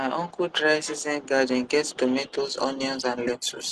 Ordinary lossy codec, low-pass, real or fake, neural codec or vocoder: Opus, 16 kbps; 10.8 kHz; real; none